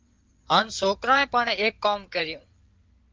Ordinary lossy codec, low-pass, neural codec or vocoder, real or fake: Opus, 32 kbps; 7.2 kHz; codec, 16 kHz in and 24 kHz out, 2.2 kbps, FireRedTTS-2 codec; fake